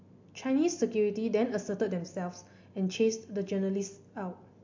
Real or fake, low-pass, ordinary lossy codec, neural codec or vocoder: real; 7.2 kHz; MP3, 48 kbps; none